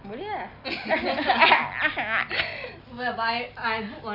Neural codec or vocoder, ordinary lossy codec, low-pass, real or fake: none; none; 5.4 kHz; real